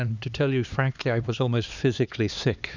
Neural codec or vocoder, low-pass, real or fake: codec, 16 kHz, 2 kbps, X-Codec, HuBERT features, trained on LibriSpeech; 7.2 kHz; fake